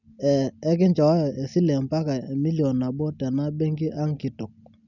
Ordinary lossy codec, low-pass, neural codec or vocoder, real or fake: none; 7.2 kHz; none; real